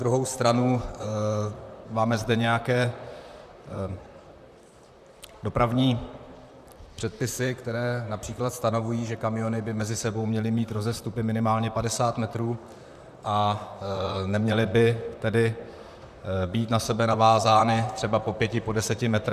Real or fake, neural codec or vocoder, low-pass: fake; vocoder, 44.1 kHz, 128 mel bands, Pupu-Vocoder; 14.4 kHz